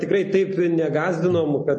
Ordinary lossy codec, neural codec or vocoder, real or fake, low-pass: MP3, 32 kbps; none; real; 9.9 kHz